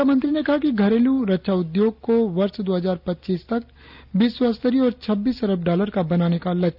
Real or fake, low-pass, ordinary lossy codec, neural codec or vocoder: real; 5.4 kHz; none; none